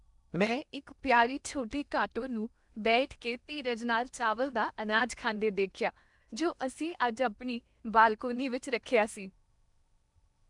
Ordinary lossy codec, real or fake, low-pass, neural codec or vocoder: none; fake; 10.8 kHz; codec, 16 kHz in and 24 kHz out, 0.8 kbps, FocalCodec, streaming, 65536 codes